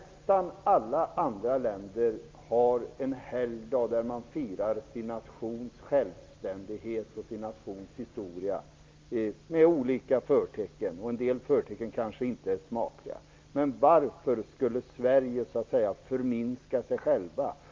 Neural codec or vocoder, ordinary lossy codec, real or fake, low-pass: none; Opus, 24 kbps; real; 7.2 kHz